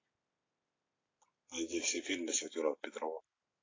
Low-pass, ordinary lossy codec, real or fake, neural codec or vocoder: 7.2 kHz; AAC, 32 kbps; real; none